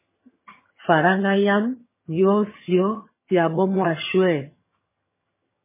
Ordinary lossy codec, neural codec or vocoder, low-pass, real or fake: MP3, 16 kbps; vocoder, 22.05 kHz, 80 mel bands, HiFi-GAN; 3.6 kHz; fake